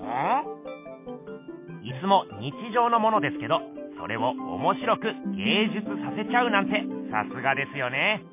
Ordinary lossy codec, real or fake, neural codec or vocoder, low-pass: MP3, 24 kbps; real; none; 3.6 kHz